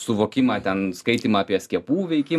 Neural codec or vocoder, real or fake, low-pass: none; real; 14.4 kHz